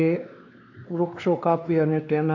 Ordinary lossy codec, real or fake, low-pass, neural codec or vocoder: AAC, 48 kbps; fake; 7.2 kHz; codec, 16 kHz, 2 kbps, X-Codec, WavLM features, trained on Multilingual LibriSpeech